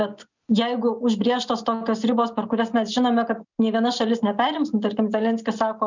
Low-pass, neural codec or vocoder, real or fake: 7.2 kHz; none; real